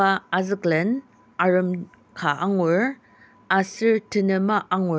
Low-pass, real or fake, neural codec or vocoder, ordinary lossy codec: none; real; none; none